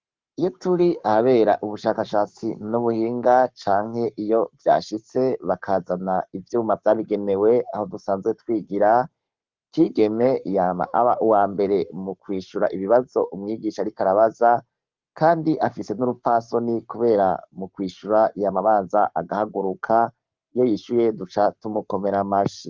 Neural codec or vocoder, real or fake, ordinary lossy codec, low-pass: codec, 44.1 kHz, 7.8 kbps, Pupu-Codec; fake; Opus, 24 kbps; 7.2 kHz